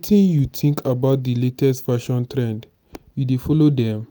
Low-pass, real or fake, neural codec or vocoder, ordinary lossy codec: none; real; none; none